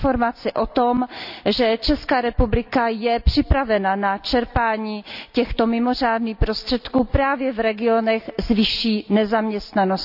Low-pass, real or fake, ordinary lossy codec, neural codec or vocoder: 5.4 kHz; real; none; none